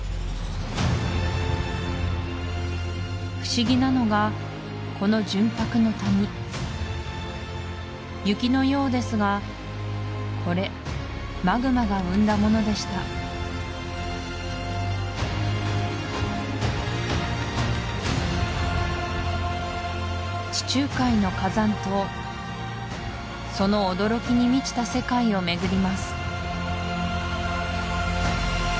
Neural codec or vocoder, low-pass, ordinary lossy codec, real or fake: none; none; none; real